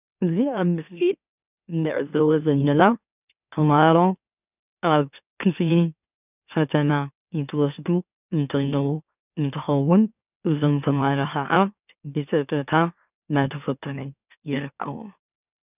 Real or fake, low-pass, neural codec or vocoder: fake; 3.6 kHz; autoencoder, 44.1 kHz, a latent of 192 numbers a frame, MeloTTS